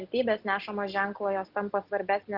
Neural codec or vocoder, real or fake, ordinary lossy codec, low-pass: none; real; Opus, 24 kbps; 5.4 kHz